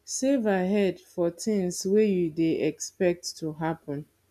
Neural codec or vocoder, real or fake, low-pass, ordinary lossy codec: none; real; 14.4 kHz; none